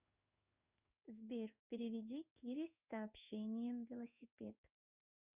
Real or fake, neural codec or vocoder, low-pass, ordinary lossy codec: fake; codec, 16 kHz in and 24 kHz out, 1 kbps, XY-Tokenizer; 3.6 kHz; Opus, 64 kbps